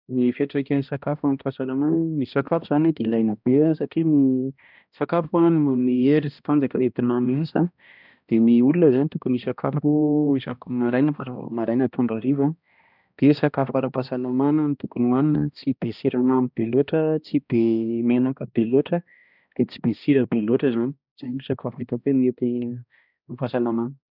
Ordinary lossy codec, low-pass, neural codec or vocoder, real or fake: none; 5.4 kHz; codec, 16 kHz, 1 kbps, X-Codec, HuBERT features, trained on balanced general audio; fake